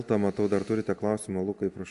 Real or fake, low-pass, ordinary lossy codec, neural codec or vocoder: real; 10.8 kHz; AAC, 96 kbps; none